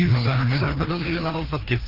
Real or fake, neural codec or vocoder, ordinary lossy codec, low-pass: fake; codec, 16 kHz, 2 kbps, FreqCodec, larger model; Opus, 32 kbps; 5.4 kHz